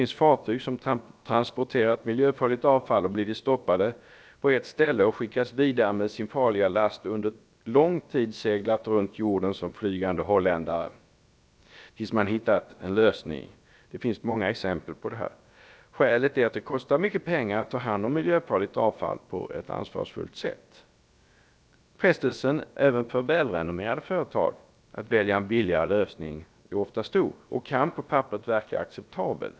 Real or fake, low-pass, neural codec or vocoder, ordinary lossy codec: fake; none; codec, 16 kHz, about 1 kbps, DyCAST, with the encoder's durations; none